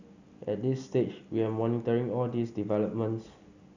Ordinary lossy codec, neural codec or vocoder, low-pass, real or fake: none; none; 7.2 kHz; real